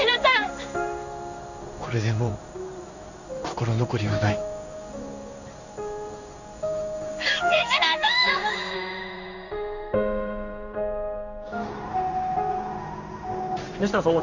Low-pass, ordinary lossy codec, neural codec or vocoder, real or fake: 7.2 kHz; none; codec, 16 kHz in and 24 kHz out, 1 kbps, XY-Tokenizer; fake